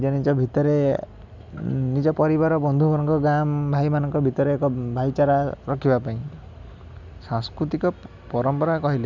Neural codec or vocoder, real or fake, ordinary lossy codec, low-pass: none; real; none; 7.2 kHz